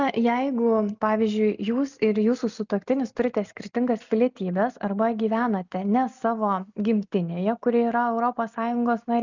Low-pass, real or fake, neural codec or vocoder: 7.2 kHz; real; none